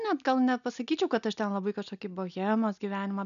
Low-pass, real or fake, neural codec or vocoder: 7.2 kHz; real; none